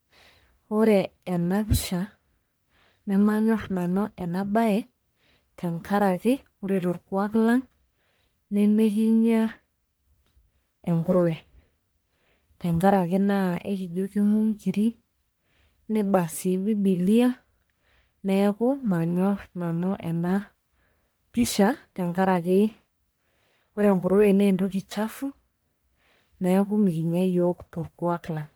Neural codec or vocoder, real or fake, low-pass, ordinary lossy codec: codec, 44.1 kHz, 1.7 kbps, Pupu-Codec; fake; none; none